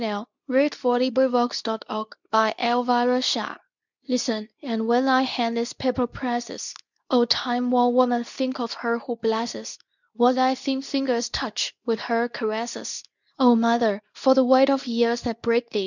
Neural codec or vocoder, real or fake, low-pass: codec, 24 kHz, 0.9 kbps, WavTokenizer, medium speech release version 2; fake; 7.2 kHz